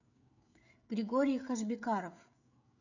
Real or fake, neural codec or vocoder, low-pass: fake; codec, 16 kHz, 16 kbps, FreqCodec, smaller model; 7.2 kHz